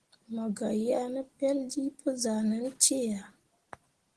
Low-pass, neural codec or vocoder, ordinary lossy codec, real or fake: 10.8 kHz; none; Opus, 16 kbps; real